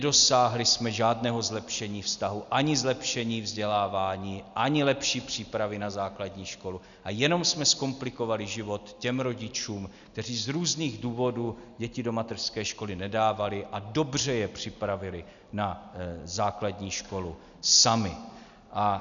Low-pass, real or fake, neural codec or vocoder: 7.2 kHz; real; none